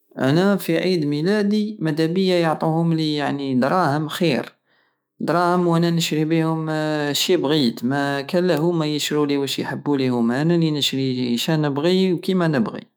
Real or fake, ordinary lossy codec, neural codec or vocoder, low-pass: fake; none; autoencoder, 48 kHz, 128 numbers a frame, DAC-VAE, trained on Japanese speech; none